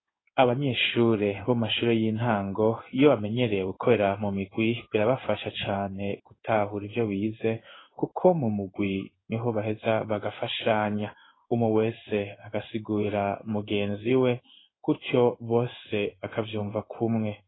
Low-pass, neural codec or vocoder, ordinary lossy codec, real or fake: 7.2 kHz; codec, 16 kHz in and 24 kHz out, 1 kbps, XY-Tokenizer; AAC, 16 kbps; fake